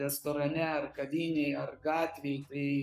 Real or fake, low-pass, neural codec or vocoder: fake; 14.4 kHz; codec, 44.1 kHz, 7.8 kbps, Pupu-Codec